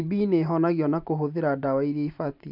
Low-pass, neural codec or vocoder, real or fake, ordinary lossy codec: 5.4 kHz; vocoder, 44.1 kHz, 128 mel bands every 512 samples, BigVGAN v2; fake; none